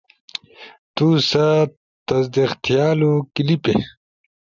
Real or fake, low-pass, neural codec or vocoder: real; 7.2 kHz; none